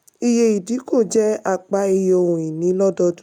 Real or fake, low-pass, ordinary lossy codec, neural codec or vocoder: real; 19.8 kHz; none; none